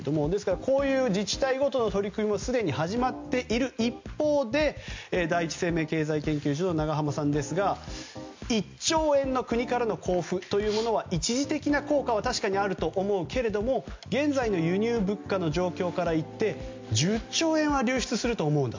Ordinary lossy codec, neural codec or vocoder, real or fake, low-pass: MP3, 64 kbps; none; real; 7.2 kHz